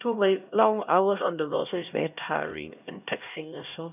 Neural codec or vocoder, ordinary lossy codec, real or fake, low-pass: codec, 16 kHz, 1 kbps, X-Codec, HuBERT features, trained on LibriSpeech; none; fake; 3.6 kHz